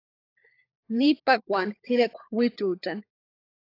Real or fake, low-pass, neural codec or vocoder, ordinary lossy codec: fake; 5.4 kHz; codec, 16 kHz, 16 kbps, FunCodec, trained on LibriTTS, 50 frames a second; AAC, 32 kbps